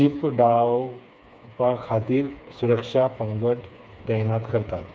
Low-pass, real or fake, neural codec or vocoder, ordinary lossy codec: none; fake; codec, 16 kHz, 4 kbps, FreqCodec, smaller model; none